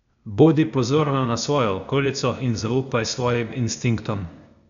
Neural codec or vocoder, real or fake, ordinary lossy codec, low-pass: codec, 16 kHz, 0.8 kbps, ZipCodec; fake; none; 7.2 kHz